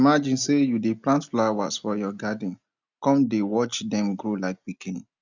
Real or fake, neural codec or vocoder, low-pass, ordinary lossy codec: real; none; 7.2 kHz; none